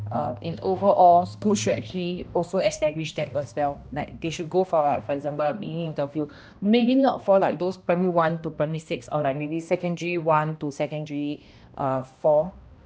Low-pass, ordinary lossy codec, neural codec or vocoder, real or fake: none; none; codec, 16 kHz, 1 kbps, X-Codec, HuBERT features, trained on balanced general audio; fake